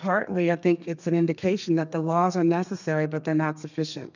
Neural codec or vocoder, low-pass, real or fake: codec, 32 kHz, 1.9 kbps, SNAC; 7.2 kHz; fake